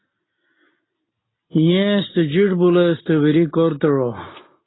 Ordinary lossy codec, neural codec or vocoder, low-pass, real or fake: AAC, 16 kbps; none; 7.2 kHz; real